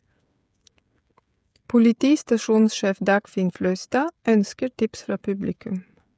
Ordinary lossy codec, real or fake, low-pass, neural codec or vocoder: none; fake; none; codec, 16 kHz, 16 kbps, FreqCodec, smaller model